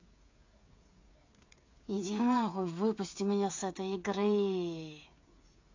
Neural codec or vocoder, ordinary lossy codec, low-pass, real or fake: codec, 16 kHz, 8 kbps, FreqCodec, smaller model; AAC, 48 kbps; 7.2 kHz; fake